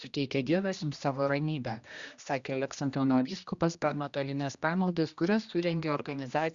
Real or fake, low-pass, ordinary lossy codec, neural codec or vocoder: fake; 7.2 kHz; Opus, 64 kbps; codec, 16 kHz, 1 kbps, X-Codec, HuBERT features, trained on general audio